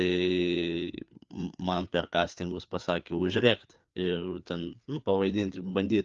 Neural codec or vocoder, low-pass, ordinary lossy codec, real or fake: codec, 16 kHz, 4 kbps, FreqCodec, larger model; 7.2 kHz; Opus, 32 kbps; fake